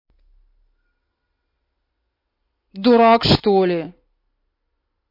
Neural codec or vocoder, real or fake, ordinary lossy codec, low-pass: none; real; MP3, 32 kbps; 5.4 kHz